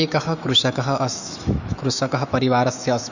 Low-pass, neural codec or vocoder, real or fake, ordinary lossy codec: 7.2 kHz; autoencoder, 48 kHz, 128 numbers a frame, DAC-VAE, trained on Japanese speech; fake; none